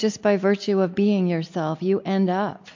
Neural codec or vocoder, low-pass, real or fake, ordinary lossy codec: none; 7.2 kHz; real; MP3, 48 kbps